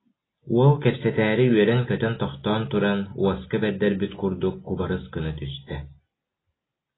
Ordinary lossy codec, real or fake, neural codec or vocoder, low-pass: AAC, 16 kbps; real; none; 7.2 kHz